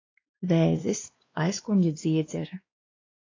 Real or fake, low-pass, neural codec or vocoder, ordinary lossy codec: fake; 7.2 kHz; codec, 16 kHz, 2 kbps, X-Codec, WavLM features, trained on Multilingual LibriSpeech; AAC, 32 kbps